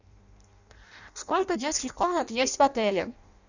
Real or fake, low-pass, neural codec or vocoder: fake; 7.2 kHz; codec, 16 kHz in and 24 kHz out, 0.6 kbps, FireRedTTS-2 codec